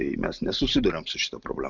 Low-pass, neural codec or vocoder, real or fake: 7.2 kHz; none; real